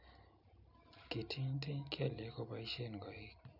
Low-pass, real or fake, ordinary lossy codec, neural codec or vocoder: 5.4 kHz; real; none; none